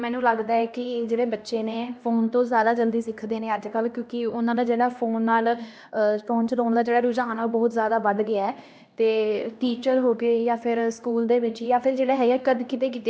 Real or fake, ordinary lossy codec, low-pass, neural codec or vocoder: fake; none; none; codec, 16 kHz, 1 kbps, X-Codec, HuBERT features, trained on LibriSpeech